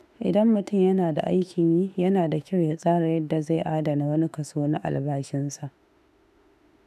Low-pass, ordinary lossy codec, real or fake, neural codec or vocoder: 14.4 kHz; none; fake; autoencoder, 48 kHz, 32 numbers a frame, DAC-VAE, trained on Japanese speech